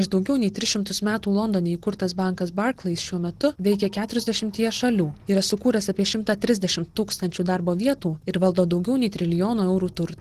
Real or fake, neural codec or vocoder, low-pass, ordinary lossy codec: real; none; 14.4 kHz; Opus, 16 kbps